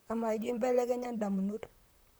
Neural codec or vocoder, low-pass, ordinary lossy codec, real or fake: vocoder, 44.1 kHz, 128 mel bands, Pupu-Vocoder; none; none; fake